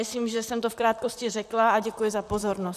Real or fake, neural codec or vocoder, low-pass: fake; vocoder, 44.1 kHz, 128 mel bands, Pupu-Vocoder; 14.4 kHz